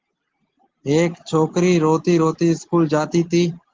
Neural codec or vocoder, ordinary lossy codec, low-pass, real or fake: none; Opus, 16 kbps; 7.2 kHz; real